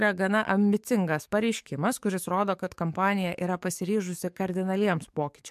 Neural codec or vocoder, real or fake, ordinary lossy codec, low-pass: codec, 44.1 kHz, 7.8 kbps, DAC; fake; MP3, 96 kbps; 14.4 kHz